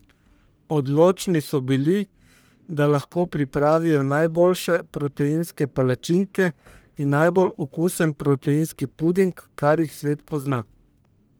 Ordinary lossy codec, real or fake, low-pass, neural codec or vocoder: none; fake; none; codec, 44.1 kHz, 1.7 kbps, Pupu-Codec